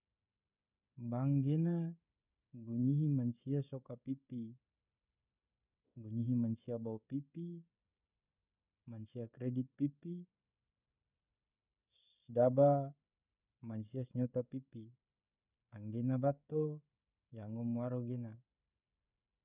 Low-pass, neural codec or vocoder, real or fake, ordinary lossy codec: 3.6 kHz; codec, 16 kHz, 16 kbps, FreqCodec, smaller model; fake; none